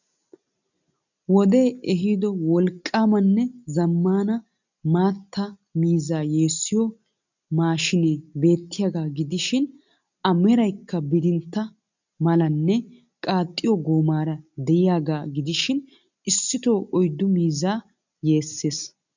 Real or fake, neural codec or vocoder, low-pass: real; none; 7.2 kHz